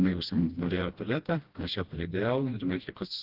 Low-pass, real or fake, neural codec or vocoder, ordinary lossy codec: 5.4 kHz; fake; codec, 16 kHz, 1 kbps, FreqCodec, smaller model; Opus, 16 kbps